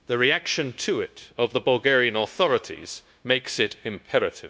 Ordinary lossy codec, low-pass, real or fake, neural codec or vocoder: none; none; fake; codec, 16 kHz, 0.9 kbps, LongCat-Audio-Codec